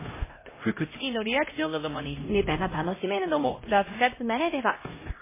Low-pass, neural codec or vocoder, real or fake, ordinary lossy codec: 3.6 kHz; codec, 16 kHz, 0.5 kbps, X-Codec, HuBERT features, trained on LibriSpeech; fake; MP3, 16 kbps